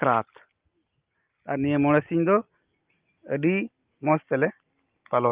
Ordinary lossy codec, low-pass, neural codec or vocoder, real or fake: Opus, 24 kbps; 3.6 kHz; none; real